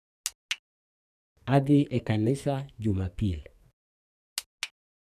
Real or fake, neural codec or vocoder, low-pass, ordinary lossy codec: fake; codec, 44.1 kHz, 2.6 kbps, SNAC; 14.4 kHz; none